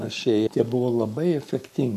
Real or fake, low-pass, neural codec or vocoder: fake; 14.4 kHz; codec, 44.1 kHz, 7.8 kbps, Pupu-Codec